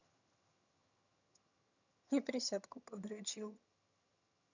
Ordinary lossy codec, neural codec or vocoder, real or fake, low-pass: none; vocoder, 22.05 kHz, 80 mel bands, HiFi-GAN; fake; 7.2 kHz